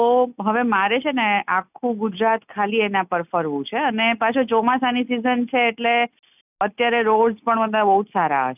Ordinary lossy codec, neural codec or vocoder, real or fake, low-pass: none; none; real; 3.6 kHz